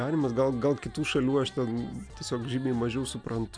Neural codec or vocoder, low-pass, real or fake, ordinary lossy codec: none; 9.9 kHz; real; MP3, 96 kbps